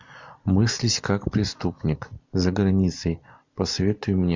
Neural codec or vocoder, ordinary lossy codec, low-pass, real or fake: none; MP3, 64 kbps; 7.2 kHz; real